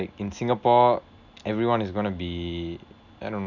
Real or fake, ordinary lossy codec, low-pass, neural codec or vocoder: real; none; 7.2 kHz; none